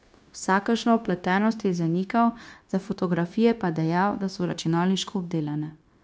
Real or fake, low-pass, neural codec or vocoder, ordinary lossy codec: fake; none; codec, 16 kHz, 0.9 kbps, LongCat-Audio-Codec; none